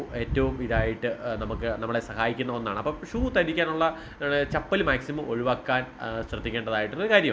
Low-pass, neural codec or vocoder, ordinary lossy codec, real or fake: none; none; none; real